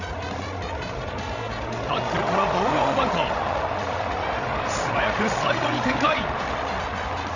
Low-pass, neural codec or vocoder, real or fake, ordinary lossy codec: 7.2 kHz; codec, 16 kHz, 16 kbps, FreqCodec, larger model; fake; none